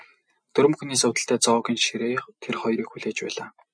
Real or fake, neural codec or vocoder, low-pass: real; none; 9.9 kHz